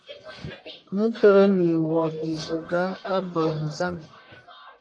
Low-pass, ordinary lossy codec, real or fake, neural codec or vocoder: 9.9 kHz; AAC, 48 kbps; fake; codec, 44.1 kHz, 1.7 kbps, Pupu-Codec